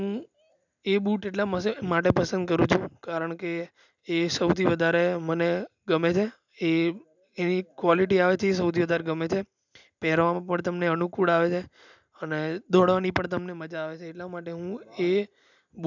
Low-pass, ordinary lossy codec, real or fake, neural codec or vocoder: 7.2 kHz; none; real; none